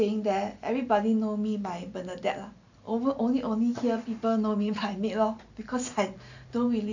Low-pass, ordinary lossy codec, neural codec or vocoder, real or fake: 7.2 kHz; none; none; real